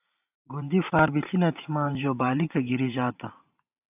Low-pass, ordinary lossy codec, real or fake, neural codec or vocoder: 3.6 kHz; AAC, 32 kbps; fake; vocoder, 44.1 kHz, 128 mel bands every 512 samples, BigVGAN v2